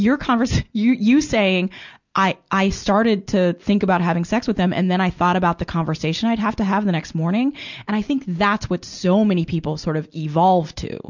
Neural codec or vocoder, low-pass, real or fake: none; 7.2 kHz; real